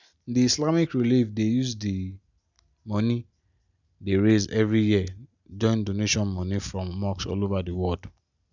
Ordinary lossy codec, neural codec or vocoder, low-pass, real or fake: none; none; 7.2 kHz; real